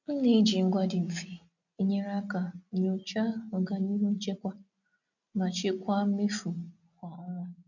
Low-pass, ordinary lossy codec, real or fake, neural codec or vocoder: 7.2 kHz; none; real; none